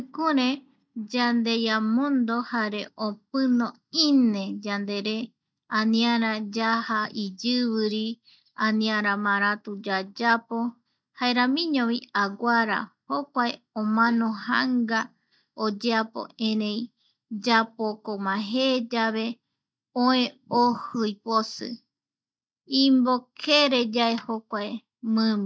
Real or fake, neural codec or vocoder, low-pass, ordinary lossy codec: real; none; none; none